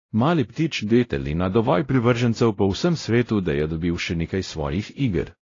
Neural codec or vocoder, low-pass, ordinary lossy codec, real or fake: codec, 16 kHz, 0.5 kbps, X-Codec, WavLM features, trained on Multilingual LibriSpeech; 7.2 kHz; AAC, 32 kbps; fake